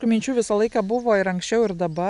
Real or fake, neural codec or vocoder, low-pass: real; none; 10.8 kHz